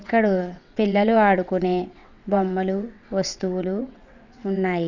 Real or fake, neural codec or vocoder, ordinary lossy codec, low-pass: fake; vocoder, 22.05 kHz, 80 mel bands, WaveNeXt; none; 7.2 kHz